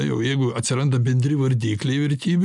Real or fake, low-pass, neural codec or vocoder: real; 10.8 kHz; none